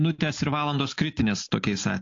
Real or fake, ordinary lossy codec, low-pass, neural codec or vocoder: real; AAC, 48 kbps; 7.2 kHz; none